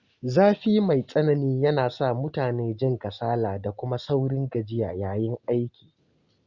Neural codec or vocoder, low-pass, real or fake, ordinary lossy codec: none; 7.2 kHz; real; Opus, 64 kbps